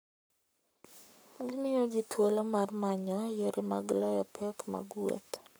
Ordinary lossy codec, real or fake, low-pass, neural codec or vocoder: none; fake; none; codec, 44.1 kHz, 7.8 kbps, Pupu-Codec